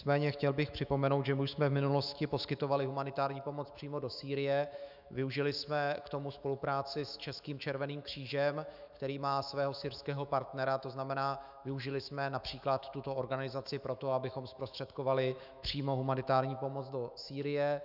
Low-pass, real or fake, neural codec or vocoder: 5.4 kHz; fake; autoencoder, 48 kHz, 128 numbers a frame, DAC-VAE, trained on Japanese speech